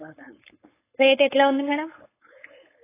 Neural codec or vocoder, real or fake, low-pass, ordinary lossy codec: codec, 16 kHz, 4.8 kbps, FACodec; fake; 3.6 kHz; AAC, 16 kbps